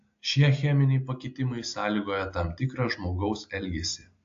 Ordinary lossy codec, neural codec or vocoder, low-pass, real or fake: AAC, 48 kbps; none; 7.2 kHz; real